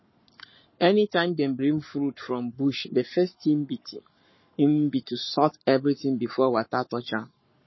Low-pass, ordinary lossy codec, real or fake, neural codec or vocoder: 7.2 kHz; MP3, 24 kbps; fake; codec, 44.1 kHz, 7.8 kbps, Pupu-Codec